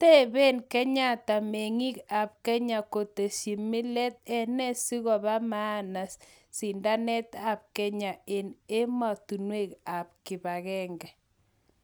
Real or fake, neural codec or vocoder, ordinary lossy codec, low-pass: real; none; none; none